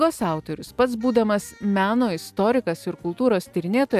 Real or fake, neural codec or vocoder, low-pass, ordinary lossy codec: real; none; 14.4 kHz; AAC, 96 kbps